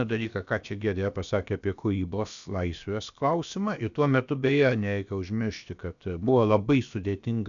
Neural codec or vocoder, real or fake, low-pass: codec, 16 kHz, about 1 kbps, DyCAST, with the encoder's durations; fake; 7.2 kHz